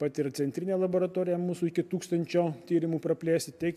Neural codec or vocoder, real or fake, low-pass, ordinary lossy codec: none; real; 14.4 kHz; MP3, 96 kbps